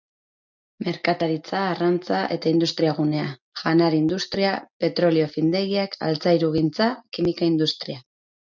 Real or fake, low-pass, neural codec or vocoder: real; 7.2 kHz; none